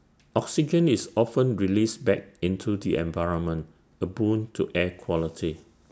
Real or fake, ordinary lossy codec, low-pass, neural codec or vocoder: real; none; none; none